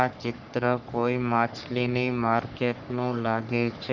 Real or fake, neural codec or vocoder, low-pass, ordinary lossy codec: fake; codec, 44.1 kHz, 3.4 kbps, Pupu-Codec; 7.2 kHz; none